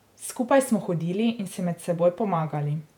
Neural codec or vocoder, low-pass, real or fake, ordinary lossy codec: vocoder, 48 kHz, 128 mel bands, Vocos; 19.8 kHz; fake; none